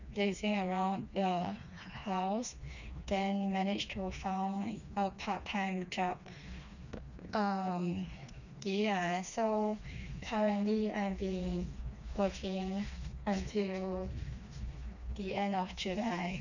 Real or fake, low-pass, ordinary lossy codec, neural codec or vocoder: fake; 7.2 kHz; none; codec, 16 kHz, 2 kbps, FreqCodec, smaller model